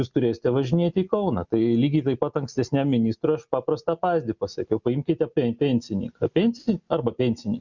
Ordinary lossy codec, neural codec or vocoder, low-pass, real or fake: Opus, 64 kbps; none; 7.2 kHz; real